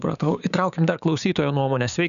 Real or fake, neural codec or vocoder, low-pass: fake; codec, 16 kHz, 6 kbps, DAC; 7.2 kHz